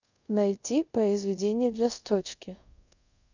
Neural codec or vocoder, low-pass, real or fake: codec, 24 kHz, 0.5 kbps, DualCodec; 7.2 kHz; fake